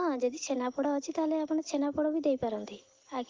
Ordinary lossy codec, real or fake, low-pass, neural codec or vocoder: Opus, 16 kbps; real; 7.2 kHz; none